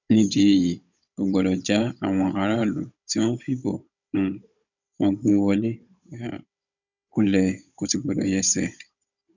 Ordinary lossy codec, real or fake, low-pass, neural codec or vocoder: none; fake; 7.2 kHz; codec, 16 kHz, 16 kbps, FunCodec, trained on Chinese and English, 50 frames a second